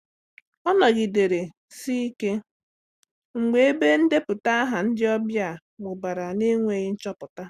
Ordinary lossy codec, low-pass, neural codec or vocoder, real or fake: Opus, 64 kbps; 14.4 kHz; none; real